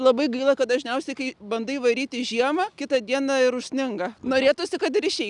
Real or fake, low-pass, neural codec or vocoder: real; 10.8 kHz; none